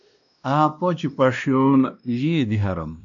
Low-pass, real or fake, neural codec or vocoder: 7.2 kHz; fake; codec, 16 kHz, 1 kbps, X-Codec, WavLM features, trained on Multilingual LibriSpeech